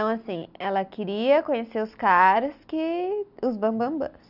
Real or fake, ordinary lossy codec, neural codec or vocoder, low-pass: real; none; none; 5.4 kHz